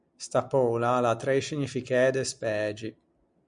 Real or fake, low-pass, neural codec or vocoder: real; 9.9 kHz; none